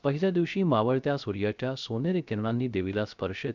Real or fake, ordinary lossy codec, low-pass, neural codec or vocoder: fake; none; 7.2 kHz; codec, 16 kHz, 0.3 kbps, FocalCodec